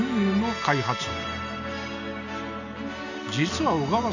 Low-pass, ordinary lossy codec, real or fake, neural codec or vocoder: 7.2 kHz; none; real; none